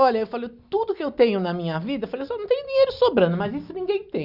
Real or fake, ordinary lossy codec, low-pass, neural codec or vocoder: real; Opus, 64 kbps; 5.4 kHz; none